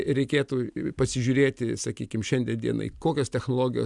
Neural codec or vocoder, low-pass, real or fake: none; 10.8 kHz; real